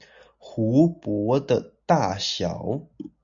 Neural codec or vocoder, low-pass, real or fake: none; 7.2 kHz; real